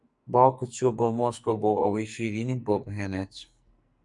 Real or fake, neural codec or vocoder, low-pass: fake; codec, 44.1 kHz, 2.6 kbps, SNAC; 10.8 kHz